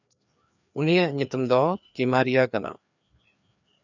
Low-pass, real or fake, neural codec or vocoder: 7.2 kHz; fake; codec, 16 kHz, 2 kbps, FreqCodec, larger model